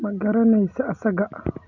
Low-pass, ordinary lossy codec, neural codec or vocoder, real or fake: 7.2 kHz; none; none; real